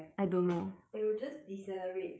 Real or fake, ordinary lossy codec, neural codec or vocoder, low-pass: fake; none; codec, 16 kHz, 16 kbps, FreqCodec, smaller model; none